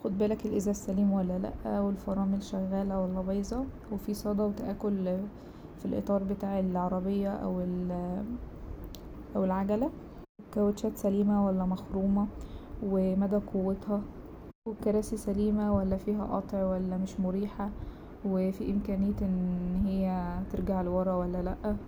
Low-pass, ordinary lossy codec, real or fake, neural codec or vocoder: none; none; real; none